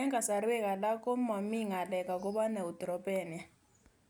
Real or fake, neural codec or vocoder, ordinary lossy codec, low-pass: real; none; none; none